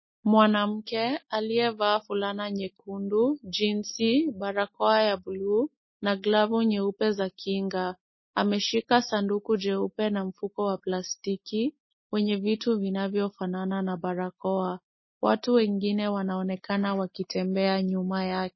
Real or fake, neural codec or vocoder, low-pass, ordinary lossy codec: real; none; 7.2 kHz; MP3, 24 kbps